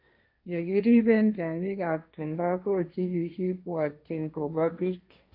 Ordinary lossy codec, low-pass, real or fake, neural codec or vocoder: AAC, 48 kbps; 5.4 kHz; fake; codec, 16 kHz, 1.1 kbps, Voila-Tokenizer